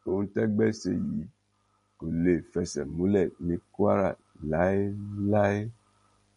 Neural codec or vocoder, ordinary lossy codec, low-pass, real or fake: none; MP3, 48 kbps; 10.8 kHz; real